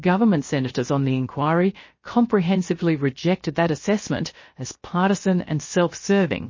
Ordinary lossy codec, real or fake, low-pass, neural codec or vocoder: MP3, 32 kbps; fake; 7.2 kHz; codec, 16 kHz, 0.7 kbps, FocalCodec